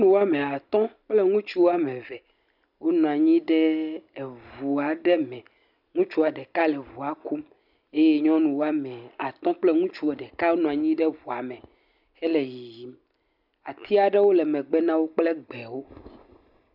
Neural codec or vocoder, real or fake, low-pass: none; real; 5.4 kHz